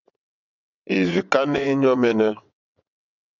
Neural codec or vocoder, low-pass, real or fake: vocoder, 22.05 kHz, 80 mel bands, WaveNeXt; 7.2 kHz; fake